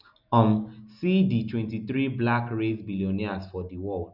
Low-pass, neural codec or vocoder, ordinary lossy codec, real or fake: 5.4 kHz; none; none; real